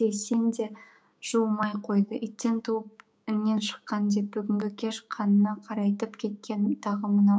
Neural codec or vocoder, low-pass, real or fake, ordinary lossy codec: codec, 16 kHz, 6 kbps, DAC; none; fake; none